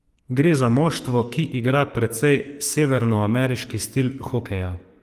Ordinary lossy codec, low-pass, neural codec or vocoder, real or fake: Opus, 32 kbps; 14.4 kHz; codec, 44.1 kHz, 2.6 kbps, SNAC; fake